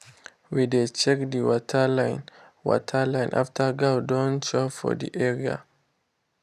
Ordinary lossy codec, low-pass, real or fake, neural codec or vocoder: none; 14.4 kHz; real; none